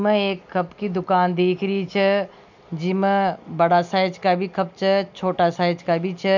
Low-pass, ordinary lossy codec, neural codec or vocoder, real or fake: 7.2 kHz; none; none; real